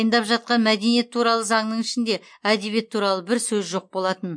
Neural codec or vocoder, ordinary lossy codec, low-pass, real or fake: none; MP3, 48 kbps; 9.9 kHz; real